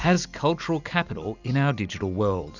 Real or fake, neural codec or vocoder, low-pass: real; none; 7.2 kHz